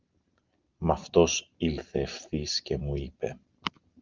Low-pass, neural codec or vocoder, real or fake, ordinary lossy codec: 7.2 kHz; none; real; Opus, 24 kbps